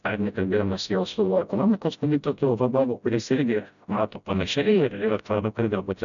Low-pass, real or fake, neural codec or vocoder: 7.2 kHz; fake; codec, 16 kHz, 0.5 kbps, FreqCodec, smaller model